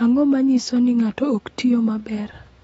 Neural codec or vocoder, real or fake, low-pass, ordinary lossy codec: autoencoder, 48 kHz, 128 numbers a frame, DAC-VAE, trained on Japanese speech; fake; 19.8 kHz; AAC, 24 kbps